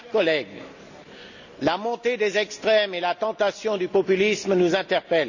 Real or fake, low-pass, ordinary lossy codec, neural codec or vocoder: real; 7.2 kHz; none; none